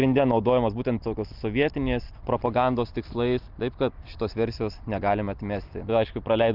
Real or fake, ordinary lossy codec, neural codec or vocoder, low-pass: real; Opus, 24 kbps; none; 5.4 kHz